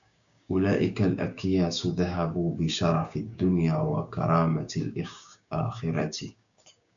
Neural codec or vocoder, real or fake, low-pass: codec, 16 kHz, 6 kbps, DAC; fake; 7.2 kHz